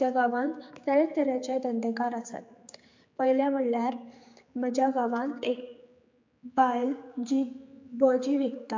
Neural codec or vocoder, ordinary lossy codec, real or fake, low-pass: codec, 16 kHz, 4 kbps, X-Codec, HuBERT features, trained on general audio; MP3, 48 kbps; fake; 7.2 kHz